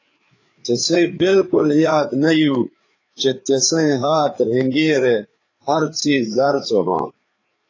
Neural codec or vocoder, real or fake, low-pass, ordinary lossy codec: codec, 16 kHz, 4 kbps, FreqCodec, larger model; fake; 7.2 kHz; AAC, 32 kbps